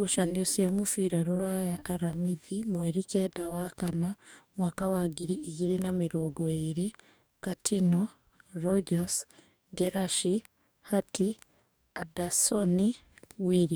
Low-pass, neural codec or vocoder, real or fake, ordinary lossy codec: none; codec, 44.1 kHz, 2.6 kbps, DAC; fake; none